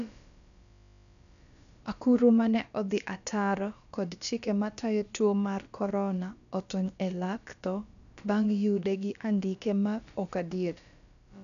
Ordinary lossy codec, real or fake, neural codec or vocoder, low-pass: none; fake; codec, 16 kHz, about 1 kbps, DyCAST, with the encoder's durations; 7.2 kHz